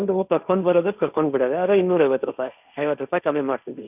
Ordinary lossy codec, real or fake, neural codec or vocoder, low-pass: none; fake; codec, 16 kHz, 1.1 kbps, Voila-Tokenizer; 3.6 kHz